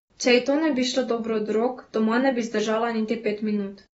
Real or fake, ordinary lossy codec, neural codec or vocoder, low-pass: real; AAC, 24 kbps; none; 14.4 kHz